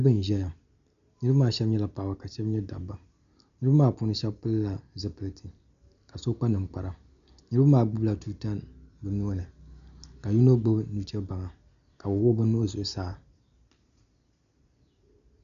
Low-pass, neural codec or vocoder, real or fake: 7.2 kHz; none; real